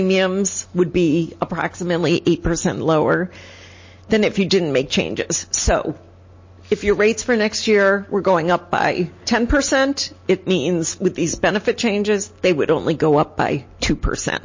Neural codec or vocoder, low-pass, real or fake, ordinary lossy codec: none; 7.2 kHz; real; MP3, 32 kbps